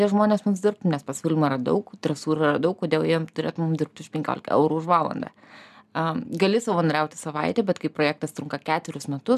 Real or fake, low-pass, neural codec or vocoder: real; 14.4 kHz; none